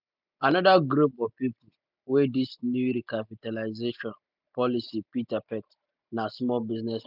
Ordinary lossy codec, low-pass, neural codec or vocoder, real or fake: none; 5.4 kHz; none; real